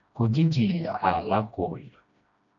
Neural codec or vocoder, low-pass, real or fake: codec, 16 kHz, 1 kbps, FreqCodec, smaller model; 7.2 kHz; fake